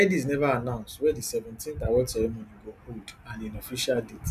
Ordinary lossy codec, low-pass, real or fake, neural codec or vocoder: none; 14.4 kHz; real; none